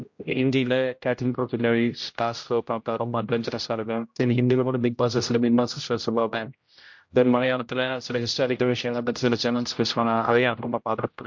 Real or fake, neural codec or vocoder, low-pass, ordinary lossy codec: fake; codec, 16 kHz, 0.5 kbps, X-Codec, HuBERT features, trained on general audio; 7.2 kHz; MP3, 48 kbps